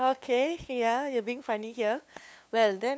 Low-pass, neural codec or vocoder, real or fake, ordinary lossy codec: none; codec, 16 kHz, 2 kbps, FunCodec, trained on LibriTTS, 25 frames a second; fake; none